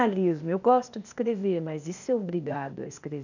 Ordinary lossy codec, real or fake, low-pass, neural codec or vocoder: none; fake; 7.2 kHz; codec, 16 kHz, 0.8 kbps, ZipCodec